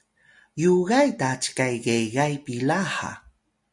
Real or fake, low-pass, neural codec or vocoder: real; 10.8 kHz; none